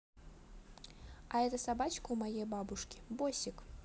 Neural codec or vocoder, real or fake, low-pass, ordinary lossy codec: none; real; none; none